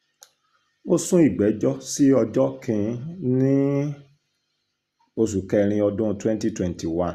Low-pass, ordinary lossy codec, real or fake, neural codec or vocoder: 14.4 kHz; Opus, 64 kbps; real; none